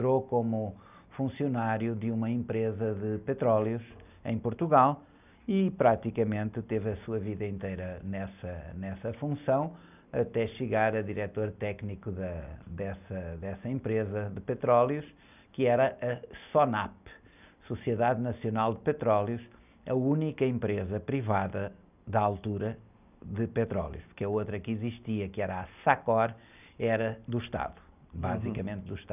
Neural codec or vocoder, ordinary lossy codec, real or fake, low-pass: none; none; real; 3.6 kHz